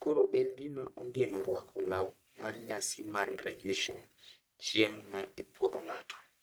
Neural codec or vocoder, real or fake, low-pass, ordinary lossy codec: codec, 44.1 kHz, 1.7 kbps, Pupu-Codec; fake; none; none